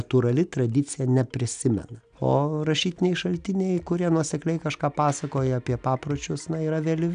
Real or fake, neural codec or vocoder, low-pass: real; none; 9.9 kHz